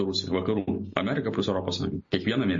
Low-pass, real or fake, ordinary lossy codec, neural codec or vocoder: 7.2 kHz; real; MP3, 32 kbps; none